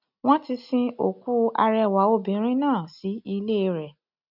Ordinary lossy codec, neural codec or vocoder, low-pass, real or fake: none; none; 5.4 kHz; real